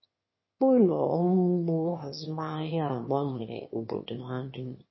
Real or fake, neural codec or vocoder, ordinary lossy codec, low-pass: fake; autoencoder, 22.05 kHz, a latent of 192 numbers a frame, VITS, trained on one speaker; MP3, 24 kbps; 7.2 kHz